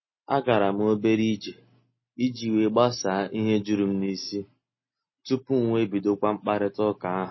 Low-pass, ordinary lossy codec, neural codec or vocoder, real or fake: 7.2 kHz; MP3, 24 kbps; none; real